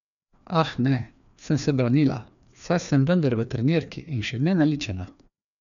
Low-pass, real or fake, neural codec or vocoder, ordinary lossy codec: 7.2 kHz; fake; codec, 16 kHz, 2 kbps, FreqCodec, larger model; none